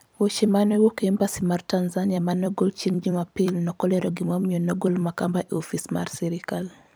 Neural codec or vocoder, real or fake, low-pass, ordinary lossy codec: vocoder, 44.1 kHz, 128 mel bands every 256 samples, BigVGAN v2; fake; none; none